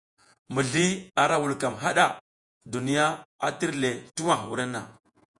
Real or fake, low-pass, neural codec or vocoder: fake; 10.8 kHz; vocoder, 48 kHz, 128 mel bands, Vocos